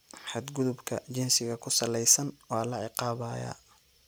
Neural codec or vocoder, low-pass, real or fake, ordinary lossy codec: none; none; real; none